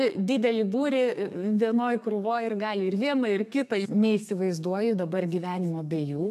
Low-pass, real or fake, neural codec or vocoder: 14.4 kHz; fake; codec, 32 kHz, 1.9 kbps, SNAC